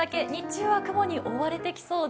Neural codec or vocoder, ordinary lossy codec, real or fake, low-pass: none; none; real; none